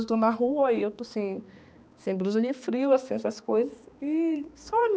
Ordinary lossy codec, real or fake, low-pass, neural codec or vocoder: none; fake; none; codec, 16 kHz, 2 kbps, X-Codec, HuBERT features, trained on balanced general audio